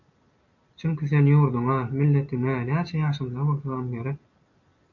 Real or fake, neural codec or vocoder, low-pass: real; none; 7.2 kHz